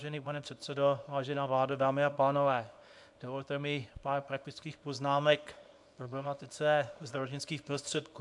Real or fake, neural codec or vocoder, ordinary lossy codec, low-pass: fake; codec, 24 kHz, 0.9 kbps, WavTokenizer, small release; AAC, 64 kbps; 10.8 kHz